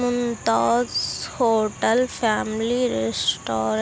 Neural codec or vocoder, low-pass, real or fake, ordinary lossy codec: none; none; real; none